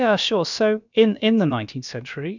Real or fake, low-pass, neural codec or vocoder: fake; 7.2 kHz; codec, 16 kHz, about 1 kbps, DyCAST, with the encoder's durations